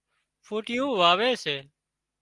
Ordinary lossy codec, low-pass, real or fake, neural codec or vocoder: Opus, 24 kbps; 10.8 kHz; real; none